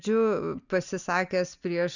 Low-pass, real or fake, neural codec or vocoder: 7.2 kHz; real; none